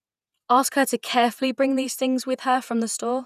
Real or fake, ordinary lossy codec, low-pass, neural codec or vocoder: fake; none; 14.4 kHz; vocoder, 48 kHz, 128 mel bands, Vocos